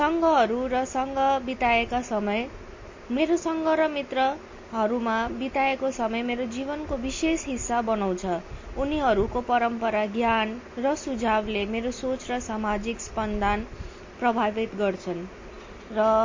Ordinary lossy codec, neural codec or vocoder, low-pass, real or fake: MP3, 32 kbps; none; 7.2 kHz; real